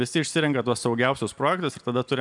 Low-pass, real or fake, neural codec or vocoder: 10.8 kHz; real; none